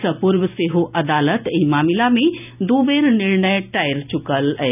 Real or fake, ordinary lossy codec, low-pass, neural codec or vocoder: real; none; 3.6 kHz; none